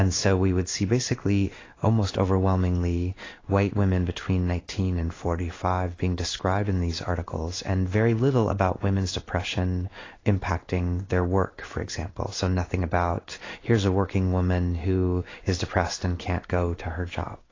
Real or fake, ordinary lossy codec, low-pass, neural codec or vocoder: fake; AAC, 32 kbps; 7.2 kHz; codec, 16 kHz in and 24 kHz out, 1 kbps, XY-Tokenizer